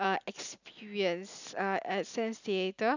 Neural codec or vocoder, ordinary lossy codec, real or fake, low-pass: none; none; real; 7.2 kHz